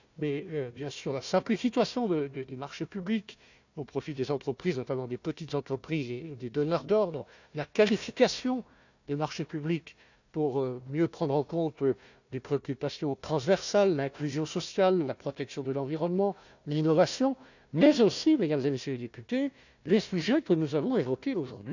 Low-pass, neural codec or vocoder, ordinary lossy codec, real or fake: 7.2 kHz; codec, 16 kHz, 1 kbps, FunCodec, trained on Chinese and English, 50 frames a second; none; fake